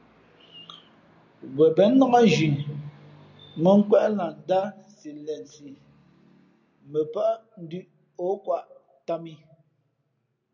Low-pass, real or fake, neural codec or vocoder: 7.2 kHz; real; none